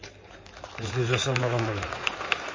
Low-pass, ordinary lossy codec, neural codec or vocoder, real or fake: 7.2 kHz; MP3, 32 kbps; codec, 16 kHz in and 24 kHz out, 2.2 kbps, FireRedTTS-2 codec; fake